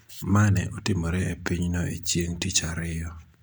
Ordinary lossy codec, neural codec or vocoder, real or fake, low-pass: none; none; real; none